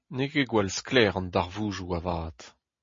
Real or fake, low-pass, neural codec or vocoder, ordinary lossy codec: real; 7.2 kHz; none; MP3, 32 kbps